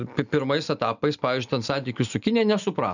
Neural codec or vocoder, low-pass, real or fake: none; 7.2 kHz; real